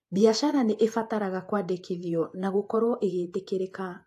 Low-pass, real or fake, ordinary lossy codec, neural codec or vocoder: 14.4 kHz; fake; AAC, 64 kbps; vocoder, 48 kHz, 128 mel bands, Vocos